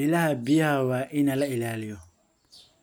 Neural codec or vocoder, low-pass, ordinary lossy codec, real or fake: none; 19.8 kHz; none; real